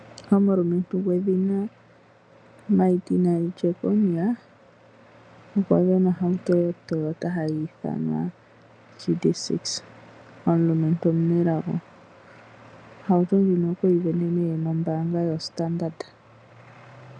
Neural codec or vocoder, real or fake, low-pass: none; real; 9.9 kHz